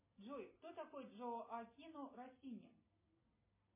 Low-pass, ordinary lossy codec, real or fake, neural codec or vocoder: 3.6 kHz; MP3, 16 kbps; real; none